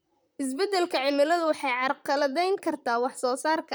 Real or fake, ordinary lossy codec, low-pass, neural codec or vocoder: fake; none; none; vocoder, 44.1 kHz, 128 mel bands, Pupu-Vocoder